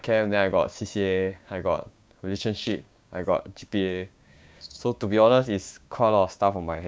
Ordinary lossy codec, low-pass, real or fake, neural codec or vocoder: none; none; fake; codec, 16 kHz, 6 kbps, DAC